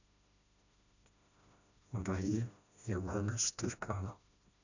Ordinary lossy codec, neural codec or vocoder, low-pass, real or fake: none; codec, 16 kHz, 1 kbps, FreqCodec, smaller model; 7.2 kHz; fake